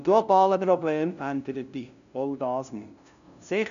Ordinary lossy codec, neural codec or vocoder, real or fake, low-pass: none; codec, 16 kHz, 0.5 kbps, FunCodec, trained on LibriTTS, 25 frames a second; fake; 7.2 kHz